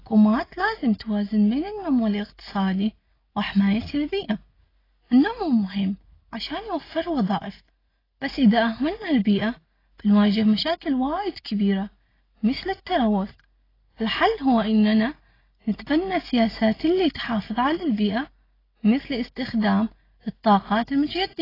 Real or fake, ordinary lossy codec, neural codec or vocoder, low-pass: real; AAC, 24 kbps; none; 5.4 kHz